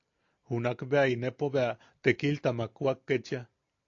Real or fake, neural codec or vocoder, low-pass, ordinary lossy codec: real; none; 7.2 kHz; AAC, 48 kbps